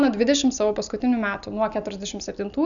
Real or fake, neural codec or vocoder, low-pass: real; none; 7.2 kHz